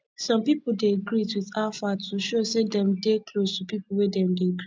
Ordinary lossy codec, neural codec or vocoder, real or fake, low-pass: none; none; real; none